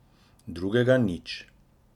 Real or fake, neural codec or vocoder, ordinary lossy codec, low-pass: real; none; none; 19.8 kHz